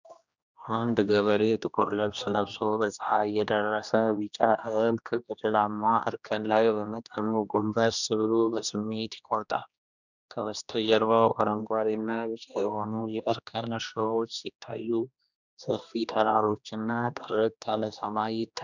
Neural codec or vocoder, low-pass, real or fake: codec, 16 kHz, 1 kbps, X-Codec, HuBERT features, trained on general audio; 7.2 kHz; fake